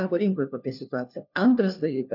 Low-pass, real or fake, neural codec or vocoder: 5.4 kHz; fake; codec, 16 kHz, 1 kbps, FunCodec, trained on LibriTTS, 50 frames a second